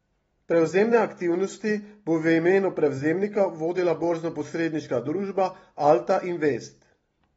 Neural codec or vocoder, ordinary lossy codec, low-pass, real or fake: none; AAC, 24 kbps; 19.8 kHz; real